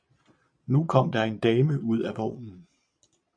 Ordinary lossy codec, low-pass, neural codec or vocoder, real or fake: MP3, 64 kbps; 9.9 kHz; vocoder, 22.05 kHz, 80 mel bands, Vocos; fake